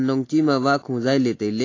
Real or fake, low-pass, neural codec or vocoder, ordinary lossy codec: real; 7.2 kHz; none; AAC, 32 kbps